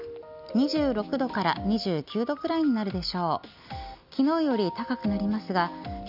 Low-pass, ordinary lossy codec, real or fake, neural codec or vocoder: 5.4 kHz; none; real; none